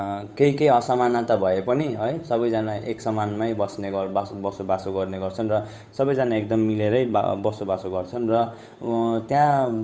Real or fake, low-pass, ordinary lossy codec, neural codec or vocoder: fake; none; none; codec, 16 kHz, 8 kbps, FunCodec, trained on Chinese and English, 25 frames a second